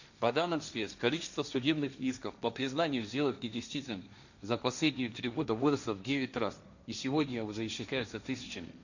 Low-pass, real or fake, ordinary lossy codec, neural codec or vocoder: 7.2 kHz; fake; none; codec, 16 kHz, 1.1 kbps, Voila-Tokenizer